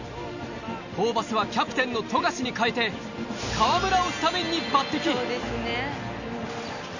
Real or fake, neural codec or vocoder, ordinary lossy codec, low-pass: real; none; none; 7.2 kHz